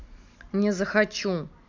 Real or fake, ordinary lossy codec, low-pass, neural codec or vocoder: real; MP3, 64 kbps; 7.2 kHz; none